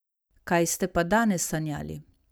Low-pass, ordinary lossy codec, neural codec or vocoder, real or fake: none; none; none; real